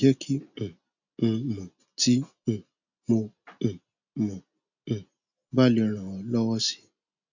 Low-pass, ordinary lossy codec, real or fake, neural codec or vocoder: 7.2 kHz; none; real; none